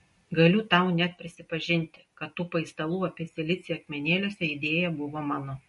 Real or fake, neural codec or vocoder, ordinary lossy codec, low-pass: real; none; MP3, 48 kbps; 14.4 kHz